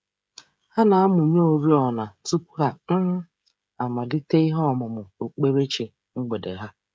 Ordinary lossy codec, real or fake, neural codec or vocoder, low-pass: none; fake; codec, 16 kHz, 16 kbps, FreqCodec, smaller model; none